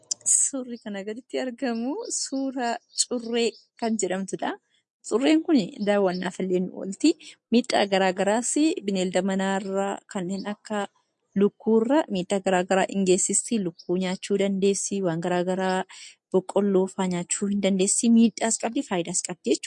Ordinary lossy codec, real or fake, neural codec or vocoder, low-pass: MP3, 48 kbps; real; none; 10.8 kHz